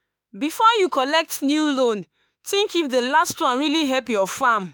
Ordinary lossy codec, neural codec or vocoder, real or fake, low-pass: none; autoencoder, 48 kHz, 32 numbers a frame, DAC-VAE, trained on Japanese speech; fake; none